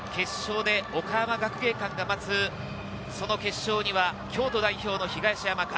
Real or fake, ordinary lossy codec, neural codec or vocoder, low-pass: real; none; none; none